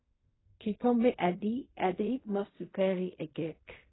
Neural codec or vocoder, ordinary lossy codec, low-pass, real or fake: codec, 16 kHz in and 24 kHz out, 0.4 kbps, LongCat-Audio-Codec, fine tuned four codebook decoder; AAC, 16 kbps; 7.2 kHz; fake